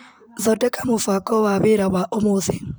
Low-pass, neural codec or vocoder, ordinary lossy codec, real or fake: none; vocoder, 44.1 kHz, 128 mel bands, Pupu-Vocoder; none; fake